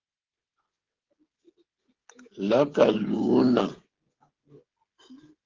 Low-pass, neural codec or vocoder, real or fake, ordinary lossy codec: 7.2 kHz; codec, 16 kHz, 16 kbps, FreqCodec, smaller model; fake; Opus, 16 kbps